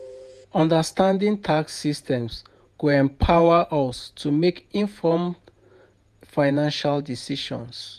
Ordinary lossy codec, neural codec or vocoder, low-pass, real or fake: none; vocoder, 48 kHz, 128 mel bands, Vocos; 14.4 kHz; fake